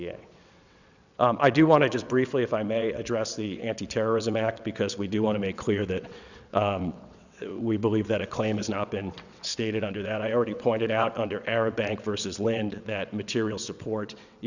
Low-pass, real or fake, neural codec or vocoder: 7.2 kHz; fake; vocoder, 22.05 kHz, 80 mel bands, WaveNeXt